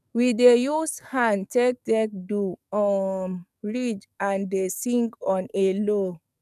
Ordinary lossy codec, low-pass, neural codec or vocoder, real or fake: none; 14.4 kHz; codec, 44.1 kHz, 7.8 kbps, DAC; fake